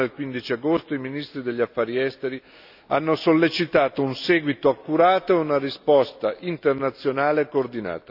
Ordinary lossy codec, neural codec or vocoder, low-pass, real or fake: none; none; 5.4 kHz; real